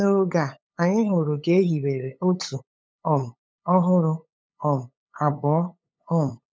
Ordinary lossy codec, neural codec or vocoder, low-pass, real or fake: none; codec, 16 kHz, 8 kbps, FunCodec, trained on LibriTTS, 25 frames a second; none; fake